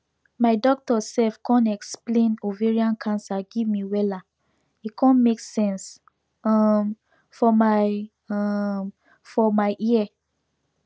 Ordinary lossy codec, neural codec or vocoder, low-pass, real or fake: none; none; none; real